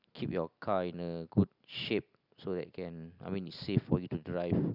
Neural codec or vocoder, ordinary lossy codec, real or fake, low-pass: none; none; real; 5.4 kHz